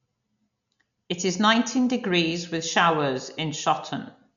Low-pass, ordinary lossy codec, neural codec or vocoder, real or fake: 7.2 kHz; none; none; real